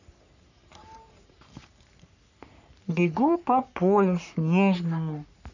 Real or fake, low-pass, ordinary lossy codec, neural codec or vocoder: fake; 7.2 kHz; none; codec, 44.1 kHz, 3.4 kbps, Pupu-Codec